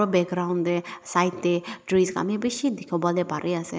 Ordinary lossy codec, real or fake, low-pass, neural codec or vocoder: none; real; none; none